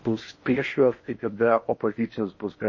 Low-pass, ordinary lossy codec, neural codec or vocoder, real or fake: 7.2 kHz; MP3, 32 kbps; codec, 16 kHz in and 24 kHz out, 0.8 kbps, FocalCodec, streaming, 65536 codes; fake